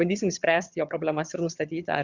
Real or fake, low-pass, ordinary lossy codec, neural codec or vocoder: fake; 7.2 kHz; Opus, 64 kbps; vocoder, 22.05 kHz, 80 mel bands, HiFi-GAN